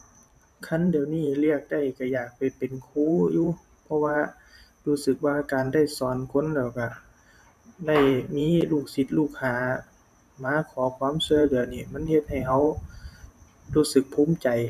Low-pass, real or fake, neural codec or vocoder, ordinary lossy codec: 14.4 kHz; fake; vocoder, 44.1 kHz, 128 mel bands every 512 samples, BigVGAN v2; none